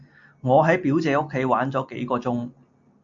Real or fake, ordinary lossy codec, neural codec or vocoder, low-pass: real; MP3, 48 kbps; none; 7.2 kHz